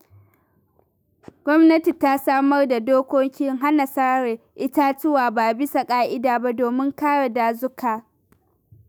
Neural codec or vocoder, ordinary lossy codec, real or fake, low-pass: autoencoder, 48 kHz, 128 numbers a frame, DAC-VAE, trained on Japanese speech; none; fake; none